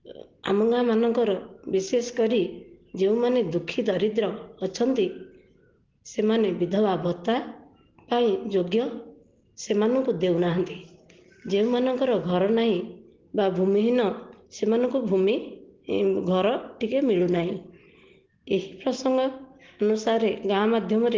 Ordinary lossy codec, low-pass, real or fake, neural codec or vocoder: Opus, 16 kbps; 7.2 kHz; real; none